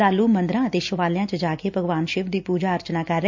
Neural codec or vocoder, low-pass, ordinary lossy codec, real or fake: none; 7.2 kHz; MP3, 64 kbps; real